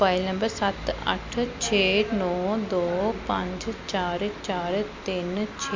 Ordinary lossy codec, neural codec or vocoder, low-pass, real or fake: MP3, 48 kbps; none; 7.2 kHz; real